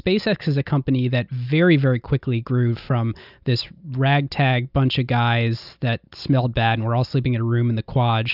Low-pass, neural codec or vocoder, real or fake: 5.4 kHz; none; real